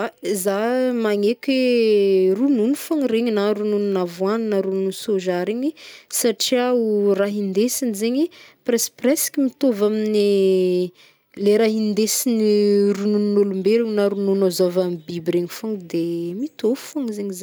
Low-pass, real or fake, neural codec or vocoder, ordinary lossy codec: none; real; none; none